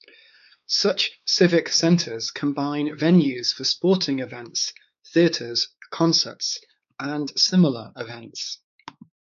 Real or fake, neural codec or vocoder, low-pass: fake; codec, 16 kHz, 4 kbps, X-Codec, WavLM features, trained on Multilingual LibriSpeech; 7.2 kHz